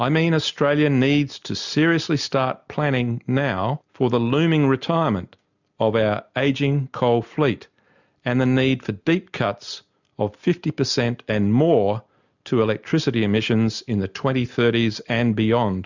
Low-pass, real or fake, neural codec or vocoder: 7.2 kHz; real; none